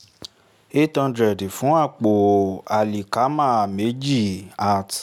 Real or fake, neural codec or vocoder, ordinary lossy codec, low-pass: real; none; none; 19.8 kHz